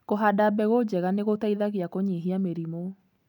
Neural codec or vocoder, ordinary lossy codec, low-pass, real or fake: none; none; 19.8 kHz; real